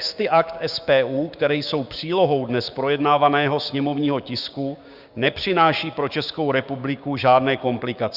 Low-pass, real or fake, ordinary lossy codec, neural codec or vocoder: 5.4 kHz; fake; Opus, 64 kbps; codec, 16 kHz, 6 kbps, DAC